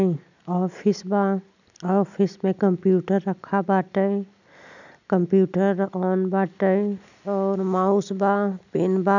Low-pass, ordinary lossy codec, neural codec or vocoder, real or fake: 7.2 kHz; none; none; real